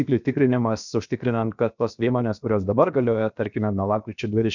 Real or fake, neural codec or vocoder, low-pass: fake; codec, 16 kHz, about 1 kbps, DyCAST, with the encoder's durations; 7.2 kHz